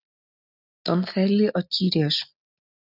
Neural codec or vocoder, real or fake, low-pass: none; real; 5.4 kHz